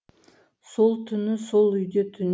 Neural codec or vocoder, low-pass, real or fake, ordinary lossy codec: none; none; real; none